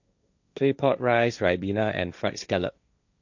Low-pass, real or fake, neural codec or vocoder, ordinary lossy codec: 7.2 kHz; fake; codec, 16 kHz, 1.1 kbps, Voila-Tokenizer; none